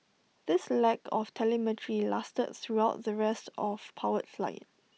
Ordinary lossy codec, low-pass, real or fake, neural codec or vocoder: none; none; real; none